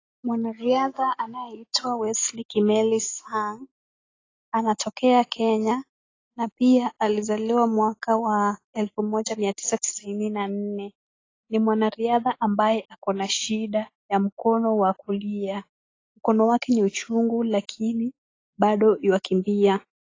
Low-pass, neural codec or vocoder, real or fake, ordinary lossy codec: 7.2 kHz; none; real; AAC, 32 kbps